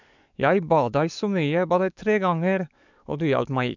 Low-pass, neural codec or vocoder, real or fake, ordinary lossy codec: 7.2 kHz; codec, 44.1 kHz, 7.8 kbps, DAC; fake; none